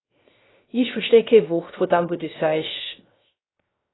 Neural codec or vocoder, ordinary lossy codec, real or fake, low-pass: codec, 16 kHz, 0.3 kbps, FocalCodec; AAC, 16 kbps; fake; 7.2 kHz